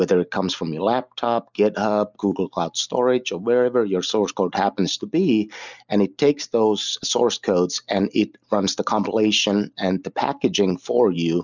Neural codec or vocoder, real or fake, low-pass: none; real; 7.2 kHz